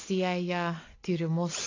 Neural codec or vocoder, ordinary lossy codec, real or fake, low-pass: none; AAC, 32 kbps; real; 7.2 kHz